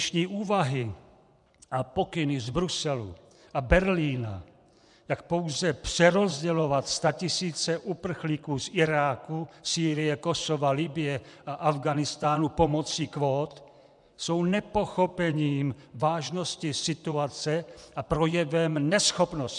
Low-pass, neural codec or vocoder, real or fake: 10.8 kHz; vocoder, 24 kHz, 100 mel bands, Vocos; fake